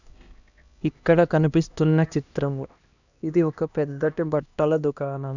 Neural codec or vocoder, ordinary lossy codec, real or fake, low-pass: codec, 16 kHz, 1 kbps, X-Codec, HuBERT features, trained on LibriSpeech; none; fake; 7.2 kHz